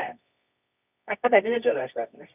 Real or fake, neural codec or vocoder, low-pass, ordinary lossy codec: fake; codec, 24 kHz, 0.9 kbps, WavTokenizer, medium music audio release; 3.6 kHz; none